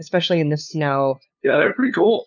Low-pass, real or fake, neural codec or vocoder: 7.2 kHz; fake; codec, 16 kHz, 2 kbps, FunCodec, trained on LibriTTS, 25 frames a second